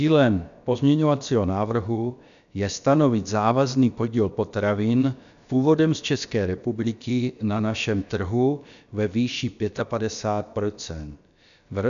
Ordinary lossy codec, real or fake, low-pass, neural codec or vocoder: MP3, 96 kbps; fake; 7.2 kHz; codec, 16 kHz, about 1 kbps, DyCAST, with the encoder's durations